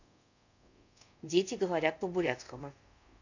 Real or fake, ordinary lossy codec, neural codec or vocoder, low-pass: fake; none; codec, 24 kHz, 0.5 kbps, DualCodec; 7.2 kHz